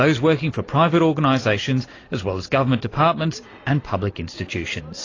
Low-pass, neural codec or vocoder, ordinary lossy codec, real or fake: 7.2 kHz; none; AAC, 32 kbps; real